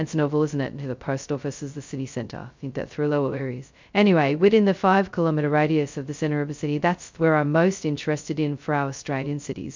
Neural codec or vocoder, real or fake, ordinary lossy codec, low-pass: codec, 16 kHz, 0.2 kbps, FocalCodec; fake; MP3, 64 kbps; 7.2 kHz